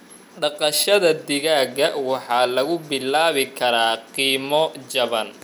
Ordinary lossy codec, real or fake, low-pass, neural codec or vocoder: none; real; 19.8 kHz; none